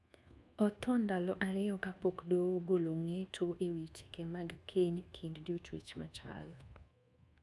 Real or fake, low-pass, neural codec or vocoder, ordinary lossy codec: fake; none; codec, 24 kHz, 1.2 kbps, DualCodec; none